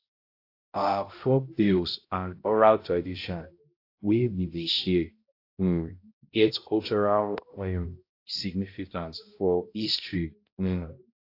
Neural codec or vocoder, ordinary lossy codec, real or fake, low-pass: codec, 16 kHz, 0.5 kbps, X-Codec, HuBERT features, trained on balanced general audio; AAC, 32 kbps; fake; 5.4 kHz